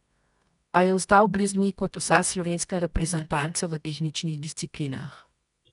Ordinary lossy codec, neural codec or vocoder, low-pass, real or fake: none; codec, 24 kHz, 0.9 kbps, WavTokenizer, medium music audio release; 10.8 kHz; fake